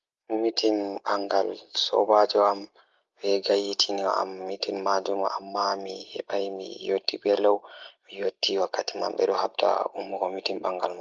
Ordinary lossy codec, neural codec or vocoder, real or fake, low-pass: Opus, 32 kbps; none; real; 7.2 kHz